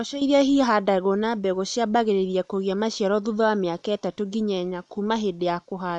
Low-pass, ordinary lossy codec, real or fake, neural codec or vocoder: 10.8 kHz; none; real; none